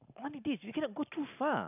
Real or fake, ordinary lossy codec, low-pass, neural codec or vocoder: real; none; 3.6 kHz; none